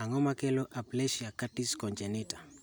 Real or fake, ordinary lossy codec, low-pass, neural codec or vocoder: real; none; none; none